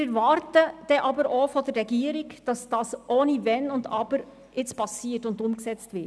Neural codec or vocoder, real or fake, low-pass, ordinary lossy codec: none; real; none; none